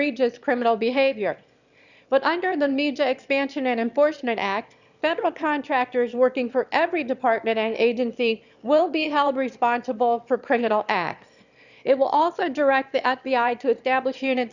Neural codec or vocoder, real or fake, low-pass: autoencoder, 22.05 kHz, a latent of 192 numbers a frame, VITS, trained on one speaker; fake; 7.2 kHz